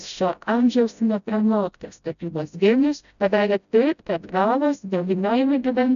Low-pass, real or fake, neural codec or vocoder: 7.2 kHz; fake; codec, 16 kHz, 0.5 kbps, FreqCodec, smaller model